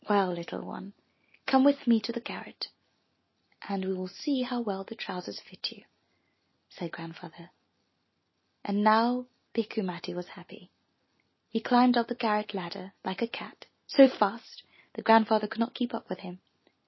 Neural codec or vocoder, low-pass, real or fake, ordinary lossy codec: none; 7.2 kHz; real; MP3, 24 kbps